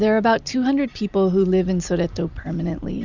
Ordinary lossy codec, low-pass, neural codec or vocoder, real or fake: Opus, 64 kbps; 7.2 kHz; vocoder, 44.1 kHz, 128 mel bands every 256 samples, BigVGAN v2; fake